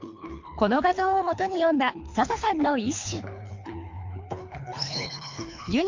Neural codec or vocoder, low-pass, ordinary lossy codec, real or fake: codec, 24 kHz, 3 kbps, HILCodec; 7.2 kHz; MP3, 48 kbps; fake